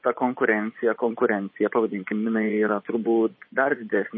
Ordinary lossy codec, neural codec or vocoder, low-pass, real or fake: MP3, 24 kbps; vocoder, 24 kHz, 100 mel bands, Vocos; 7.2 kHz; fake